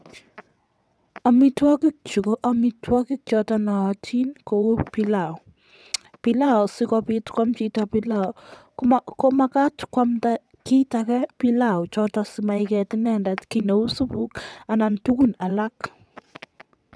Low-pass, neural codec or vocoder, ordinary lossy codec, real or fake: none; vocoder, 22.05 kHz, 80 mel bands, WaveNeXt; none; fake